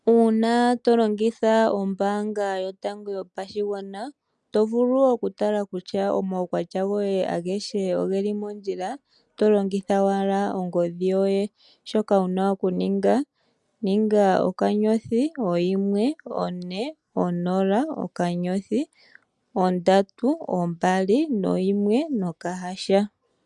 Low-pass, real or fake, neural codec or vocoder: 10.8 kHz; real; none